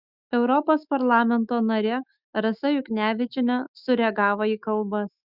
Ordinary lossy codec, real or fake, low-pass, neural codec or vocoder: Opus, 64 kbps; fake; 5.4 kHz; autoencoder, 48 kHz, 128 numbers a frame, DAC-VAE, trained on Japanese speech